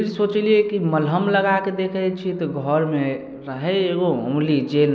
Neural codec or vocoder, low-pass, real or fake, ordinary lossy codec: none; none; real; none